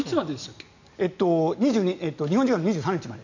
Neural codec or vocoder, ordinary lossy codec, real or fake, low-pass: none; none; real; 7.2 kHz